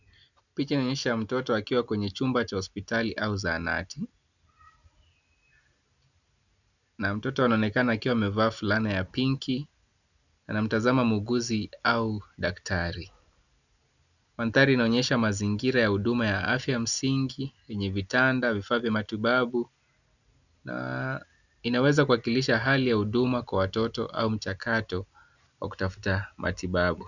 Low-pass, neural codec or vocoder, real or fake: 7.2 kHz; none; real